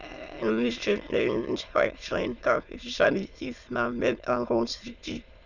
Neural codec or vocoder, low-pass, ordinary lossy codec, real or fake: autoencoder, 22.05 kHz, a latent of 192 numbers a frame, VITS, trained on many speakers; 7.2 kHz; none; fake